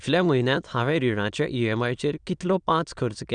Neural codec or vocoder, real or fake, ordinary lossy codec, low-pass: autoencoder, 22.05 kHz, a latent of 192 numbers a frame, VITS, trained on many speakers; fake; none; 9.9 kHz